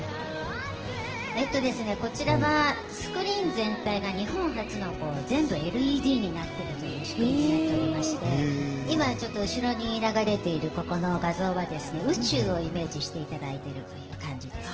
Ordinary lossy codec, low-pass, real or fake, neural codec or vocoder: Opus, 16 kbps; 7.2 kHz; real; none